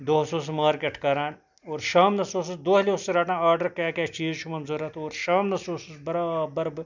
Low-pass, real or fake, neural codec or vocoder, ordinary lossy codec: 7.2 kHz; real; none; none